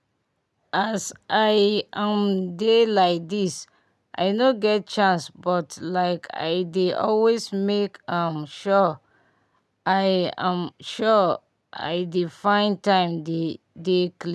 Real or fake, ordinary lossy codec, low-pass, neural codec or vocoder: real; none; none; none